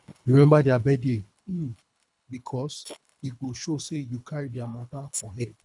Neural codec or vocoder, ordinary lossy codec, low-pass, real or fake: codec, 24 kHz, 3 kbps, HILCodec; none; 10.8 kHz; fake